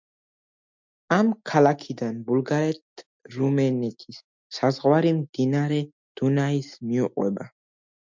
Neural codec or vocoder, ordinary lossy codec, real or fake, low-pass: none; MP3, 64 kbps; real; 7.2 kHz